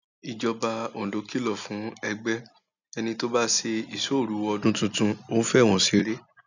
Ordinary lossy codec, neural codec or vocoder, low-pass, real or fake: none; none; 7.2 kHz; real